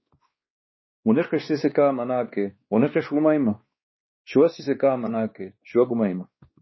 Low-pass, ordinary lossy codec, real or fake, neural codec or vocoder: 7.2 kHz; MP3, 24 kbps; fake; codec, 16 kHz, 2 kbps, X-Codec, WavLM features, trained on Multilingual LibriSpeech